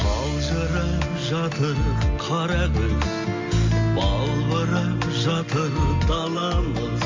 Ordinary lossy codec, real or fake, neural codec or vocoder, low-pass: MP3, 48 kbps; real; none; 7.2 kHz